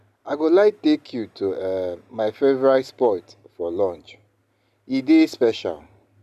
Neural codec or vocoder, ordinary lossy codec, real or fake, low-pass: none; none; real; 14.4 kHz